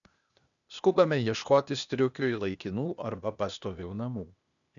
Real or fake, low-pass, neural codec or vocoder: fake; 7.2 kHz; codec, 16 kHz, 0.8 kbps, ZipCodec